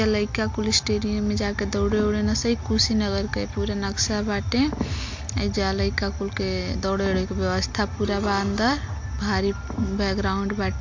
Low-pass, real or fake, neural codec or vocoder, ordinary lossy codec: 7.2 kHz; real; none; MP3, 48 kbps